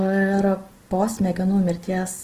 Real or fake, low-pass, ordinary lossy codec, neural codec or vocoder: real; 14.4 kHz; Opus, 16 kbps; none